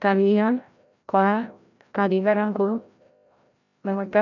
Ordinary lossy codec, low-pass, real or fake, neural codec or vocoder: none; 7.2 kHz; fake; codec, 16 kHz, 0.5 kbps, FreqCodec, larger model